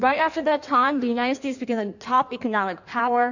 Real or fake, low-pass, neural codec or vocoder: fake; 7.2 kHz; codec, 16 kHz in and 24 kHz out, 1.1 kbps, FireRedTTS-2 codec